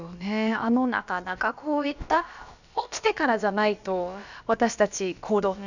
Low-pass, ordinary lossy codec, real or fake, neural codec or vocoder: 7.2 kHz; none; fake; codec, 16 kHz, about 1 kbps, DyCAST, with the encoder's durations